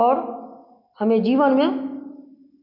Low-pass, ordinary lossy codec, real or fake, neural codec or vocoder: 5.4 kHz; none; real; none